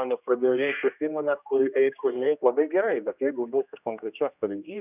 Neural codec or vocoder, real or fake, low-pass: codec, 16 kHz, 1 kbps, X-Codec, HuBERT features, trained on general audio; fake; 3.6 kHz